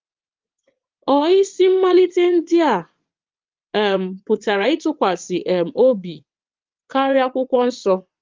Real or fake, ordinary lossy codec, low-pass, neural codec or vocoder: fake; Opus, 24 kbps; 7.2 kHz; vocoder, 22.05 kHz, 80 mel bands, WaveNeXt